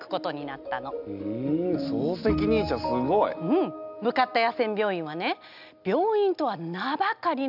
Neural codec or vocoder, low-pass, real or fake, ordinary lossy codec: none; 5.4 kHz; real; none